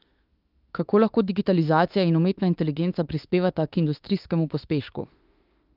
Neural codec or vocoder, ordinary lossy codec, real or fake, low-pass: autoencoder, 48 kHz, 32 numbers a frame, DAC-VAE, trained on Japanese speech; Opus, 32 kbps; fake; 5.4 kHz